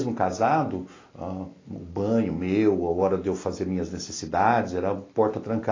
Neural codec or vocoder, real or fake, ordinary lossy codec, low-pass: none; real; AAC, 32 kbps; 7.2 kHz